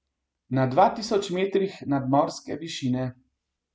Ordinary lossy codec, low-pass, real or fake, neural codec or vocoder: none; none; real; none